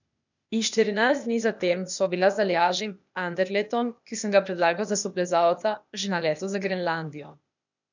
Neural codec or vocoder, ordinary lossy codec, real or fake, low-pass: codec, 16 kHz, 0.8 kbps, ZipCodec; none; fake; 7.2 kHz